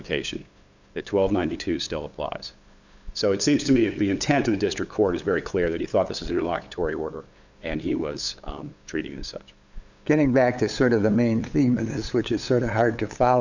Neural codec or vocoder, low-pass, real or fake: codec, 16 kHz, 8 kbps, FunCodec, trained on LibriTTS, 25 frames a second; 7.2 kHz; fake